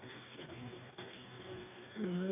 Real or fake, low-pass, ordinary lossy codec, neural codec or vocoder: fake; 3.6 kHz; AAC, 24 kbps; codec, 44.1 kHz, 2.6 kbps, DAC